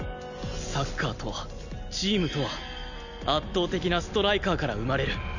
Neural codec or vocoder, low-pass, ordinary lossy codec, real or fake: none; 7.2 kHz; none; real